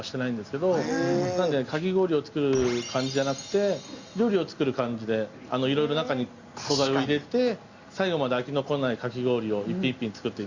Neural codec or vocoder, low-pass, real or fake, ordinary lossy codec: none; 7.2 kHz; real; Opus, 64 kbps